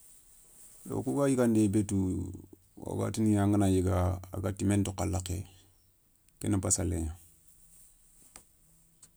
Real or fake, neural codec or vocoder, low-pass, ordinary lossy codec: real; none; none; none